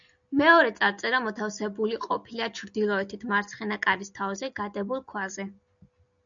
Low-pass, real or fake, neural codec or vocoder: 7.2 kHz; real; none